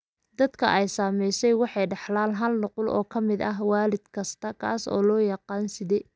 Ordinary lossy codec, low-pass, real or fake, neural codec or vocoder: none; none; real; none